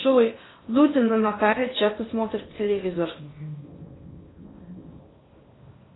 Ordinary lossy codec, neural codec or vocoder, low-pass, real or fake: AAC, 16 kbps; codec, 16 kHz in and 24 kHz out, 0.8 kbps, FocalCodec, streaming, 65536 codes; 7.2 kHz; fake